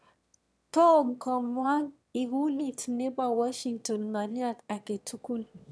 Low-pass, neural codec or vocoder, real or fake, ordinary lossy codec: none; autoencoder, 22.05 kHz, a latent of 192 numbers a frame, VITS, trained on one speaker; fake; none